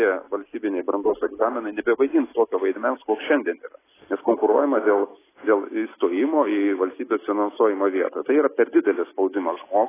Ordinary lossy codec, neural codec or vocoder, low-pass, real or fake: AAC, 16 kbps; none; 3.6 kHz; real